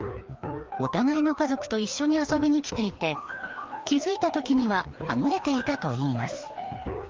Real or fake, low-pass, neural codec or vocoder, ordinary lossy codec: fake; 7.2 kHz; codec, 16 kHz, 2 kbps, FreqCodec, larger model; Opus, 32 kbps